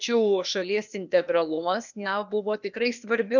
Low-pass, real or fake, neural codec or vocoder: 7.2 kHz; fake; codec, 16 kHz, 0.8 kbps, ZipCodec